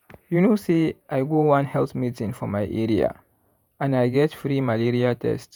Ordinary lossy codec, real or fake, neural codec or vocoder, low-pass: none; fake; vocoder, 48 kHz, 128 mel bands, Vocos; none